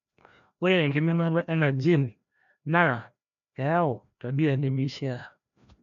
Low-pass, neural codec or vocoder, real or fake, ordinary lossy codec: 7.2 kHz; codec, 16 kHz, 1 kbps, FreqCodec, larger model; fake; MP3, 96 kbps